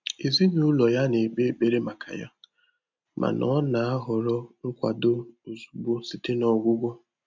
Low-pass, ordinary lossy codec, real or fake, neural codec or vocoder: 7.2 kHz; MP3, 64 kbps; real; none